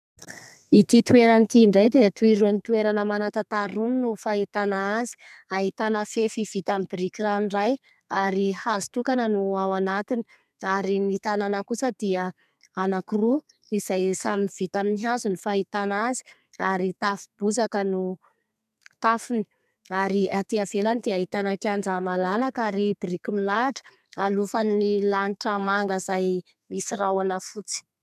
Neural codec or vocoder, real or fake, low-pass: codec, 32 kHz, 1.9 kbps, SNAC; fake; 14.4 kHz